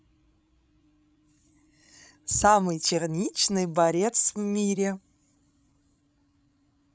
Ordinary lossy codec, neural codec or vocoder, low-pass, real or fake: none; codec, 16 kHz, 16 kbps, FreqCodec, larger model; none; fake